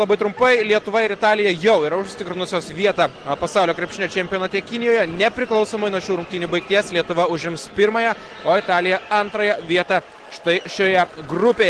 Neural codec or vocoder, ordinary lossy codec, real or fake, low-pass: none; Opus, 16 kbps; real; 10.8 kHz